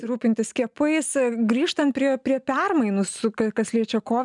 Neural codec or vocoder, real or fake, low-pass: none; real; 10.8 kHz